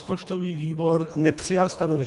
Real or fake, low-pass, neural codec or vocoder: fake; 10.8 kHz; codec, 24 kHz, 1.5 kbps, HILCodec